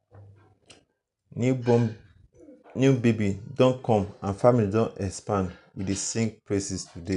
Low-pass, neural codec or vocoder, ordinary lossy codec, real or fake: 10.8 kHz; none; none; real